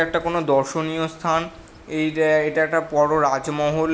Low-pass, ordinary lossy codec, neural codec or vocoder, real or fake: none; none; none; real